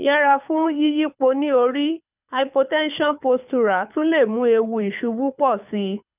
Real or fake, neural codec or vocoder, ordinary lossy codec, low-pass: fake; codec, 24 kHz, 6 kbps, HILCodec; none; 3.6 kHz